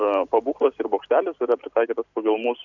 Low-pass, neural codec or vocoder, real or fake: 7.2 kHz; none; real